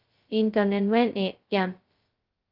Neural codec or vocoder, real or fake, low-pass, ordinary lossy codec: codec, 16 kHz, 0.2 kbps, FocalCodec; fake; 5.4 kHz; Opus, 32 kbps